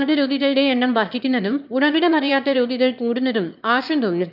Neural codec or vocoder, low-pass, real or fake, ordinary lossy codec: autoencoder, 22.05 kHz, a latent of 192 numbers a frame, VITS, trained on one speaker; 5.4 kHz; fake; none